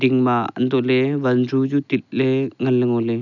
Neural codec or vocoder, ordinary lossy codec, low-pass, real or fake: none; none; 7.2 kHz; real